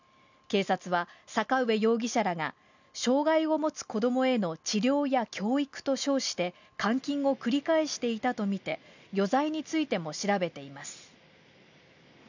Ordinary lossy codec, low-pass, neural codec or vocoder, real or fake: none; 7.2 kHz; none; real